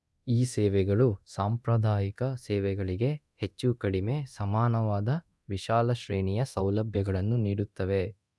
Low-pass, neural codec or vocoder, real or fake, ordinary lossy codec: none; codec, 24 kHz, 0.9 kbps, DualCodec; fake; none